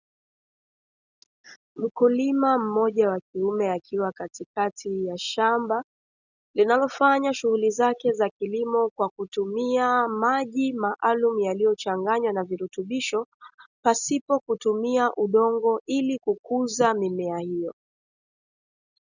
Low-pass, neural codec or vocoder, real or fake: 7.2 kHz; none; real